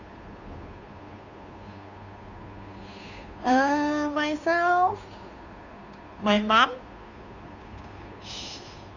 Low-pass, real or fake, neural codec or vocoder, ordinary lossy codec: 7.2 kHz; fake; codec, 16 kHz, 2 kbps, FunCodec, trained on Chinese and English, 25 frames a second; none